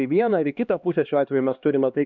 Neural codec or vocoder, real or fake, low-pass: codec, 16 kHz, 2 kbps, X-Codec, HuBERT features, trained on LibriSpeech; fake; 7.2 kHz